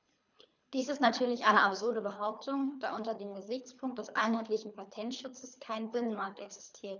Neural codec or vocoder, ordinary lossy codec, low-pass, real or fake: codec, 24 kHz, 3 kbps, HILCodec; none; 7.2 kHz; fake